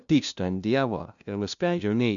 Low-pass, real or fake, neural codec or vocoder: 7.2 kHz; fake; codec, 16 kHz, 0.5 kbps, FunCodec, trained on LibriTTS, 25 frames a second